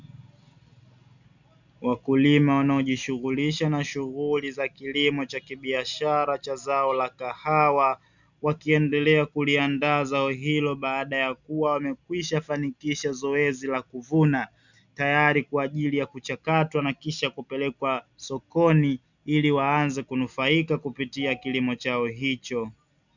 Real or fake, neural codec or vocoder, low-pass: real; none; 7.2 kHz